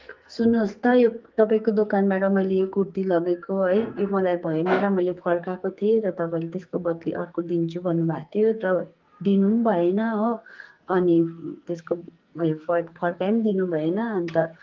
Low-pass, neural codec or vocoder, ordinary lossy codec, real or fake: 7.2 kHz; codec, 44.1 kHz, 2.6 kbps, SNAC; Opus, 32 kbps; fake